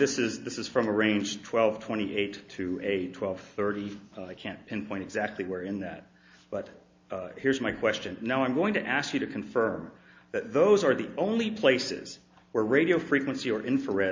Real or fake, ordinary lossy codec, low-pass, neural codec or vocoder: real; MP3, 48 kbps; 7.2 kHz; none